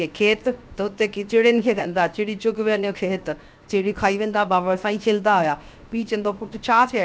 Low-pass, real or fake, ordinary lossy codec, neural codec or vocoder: none; fake; none; codec, 16 kHz, 0.7 kbps, FocalCodec